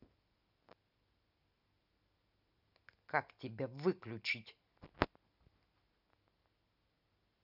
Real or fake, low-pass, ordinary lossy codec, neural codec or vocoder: real; 5.4 kHz; none; none